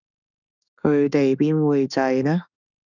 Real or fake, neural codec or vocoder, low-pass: fake; autoencoder, 48 kHz, 32 numbers a frame, DAC-VAE, trained on Japanese speech; 7.2 kHz